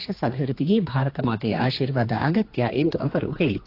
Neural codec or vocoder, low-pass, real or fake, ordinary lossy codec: codec, 16 kHz, 2 kbps, X-Codec, HuBERT features, trained on general audio; 5.4 kHz; fake; AAC, 32 kbps